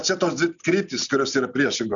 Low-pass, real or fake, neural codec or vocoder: 7.2 kHz; real; none